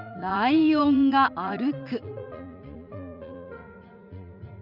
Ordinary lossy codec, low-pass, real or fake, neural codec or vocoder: none; 5.4 kHz; fake; vocoder, 44.1 kHz, 80 mel bands, Vocos